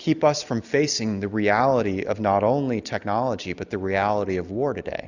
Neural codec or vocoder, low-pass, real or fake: none; 7.2 kHz; real